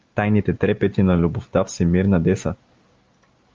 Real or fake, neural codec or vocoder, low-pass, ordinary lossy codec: real; none; 7.2 kHz; Opus, 24 kbps